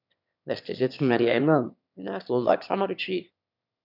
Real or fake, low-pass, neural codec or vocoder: fake; 5.4 kHz; autoencoder, 22.05 kHz, a latent of 192 numbers a frame, VITS, trained on one speaker